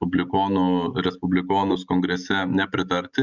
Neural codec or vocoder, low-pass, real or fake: none; 7.2 kHz; real